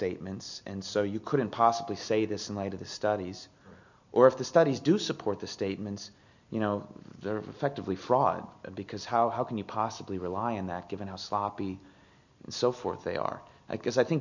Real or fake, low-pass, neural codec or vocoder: real; 7.2 kHz; none